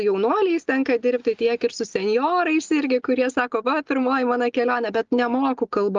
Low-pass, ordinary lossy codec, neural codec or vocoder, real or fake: 7.2 kHz; Opus, 32 kbps; none; real